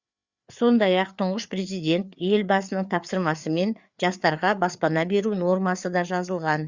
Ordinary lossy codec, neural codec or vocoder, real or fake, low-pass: Opus, 64 kbps; codec, 16 kHz, 4 kbps, FreqCodec, larger model; fake; 7.2 kHz